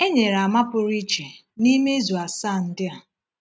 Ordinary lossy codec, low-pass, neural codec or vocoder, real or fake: none; none; none; real